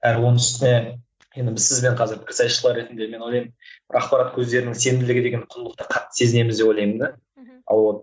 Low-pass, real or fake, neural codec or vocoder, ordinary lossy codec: none; real; none; none